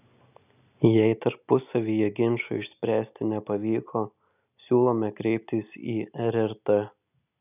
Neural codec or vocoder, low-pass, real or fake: none; 3.6 kHz; real